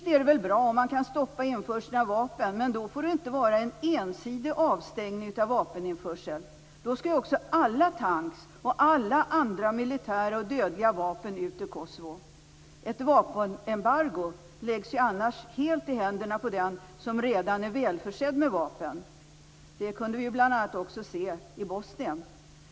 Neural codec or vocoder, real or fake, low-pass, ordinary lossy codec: none; real; none; none